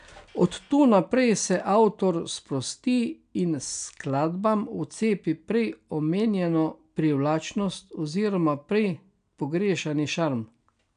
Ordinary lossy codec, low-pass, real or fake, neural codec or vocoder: none; 9.9 kHz; real; none